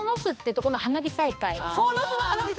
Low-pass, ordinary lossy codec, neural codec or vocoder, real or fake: none; none; codec, 16 kHz, 2 kbps, X-Codec, HuBERT features, trained on balanced general audio; fake